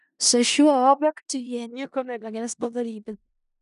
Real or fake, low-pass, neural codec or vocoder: fake; 10.8 kHz; codec, 16 kHz in and 24 kHz out, 0.4 kbps, LongCat-Audio-Codec, four codebook decoder